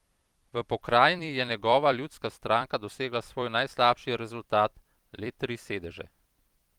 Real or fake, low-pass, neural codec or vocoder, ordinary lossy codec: fake; 19.8 kHz; vocoder, 44.1 kHz, 128 mel bands every 256 samples, BigVGAN v2; Opus, 24 kbps